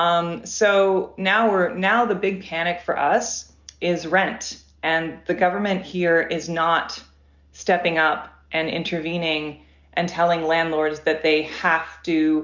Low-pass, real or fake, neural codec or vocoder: 7.2 kHz; real; none